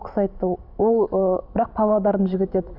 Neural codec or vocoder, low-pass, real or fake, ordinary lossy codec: vocoder, 44.1 kHz, 128 mel bands every 512 samples, BigVGAN v2; 5.4 kHz; fake; none